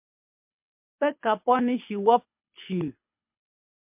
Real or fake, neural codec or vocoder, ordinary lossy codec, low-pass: fake; codec, 24 kHz, 6 kbps, HILCodec; MP3, 32 kbps; 3.6 kHz